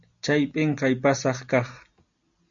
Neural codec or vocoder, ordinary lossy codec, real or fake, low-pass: none; MP3, 64 kbps; real; 7.2 kHz